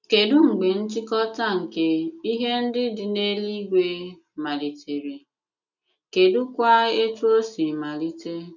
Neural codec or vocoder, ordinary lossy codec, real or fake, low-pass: none; none; real; 7.2 kHz